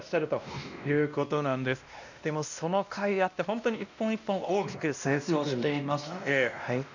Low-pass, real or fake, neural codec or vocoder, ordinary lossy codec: 7.2 kHz; fake; codec, 16 kHz, 1 kbps, X-Codec, WavLM features, trained on Multilingual LibriSpeech; none